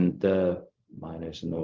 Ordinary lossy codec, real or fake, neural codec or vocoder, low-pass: Opus, 32 kbps; fake; codec, 16 kHz, 0.4 kbps, LongCat-Audio-Codec; 7.2 kHz